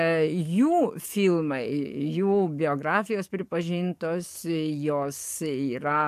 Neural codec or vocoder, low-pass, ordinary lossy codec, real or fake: codec, 44.1 kHz, 7.8 kbps, DAC; 14.4 kHz; MP3, 96 kbps; fake